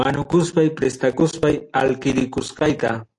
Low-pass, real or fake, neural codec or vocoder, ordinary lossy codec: 10.8 kHz; real; none; AAC, 48 kbps